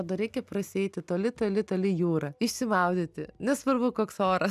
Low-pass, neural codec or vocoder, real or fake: 14.4 kHz; none; real